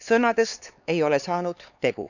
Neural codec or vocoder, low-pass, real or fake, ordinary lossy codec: codec, 16 kHz, 4 kbps, X-Codec, HuBERT features, trained on LibriSpeech; 7.2 kHz; fake; none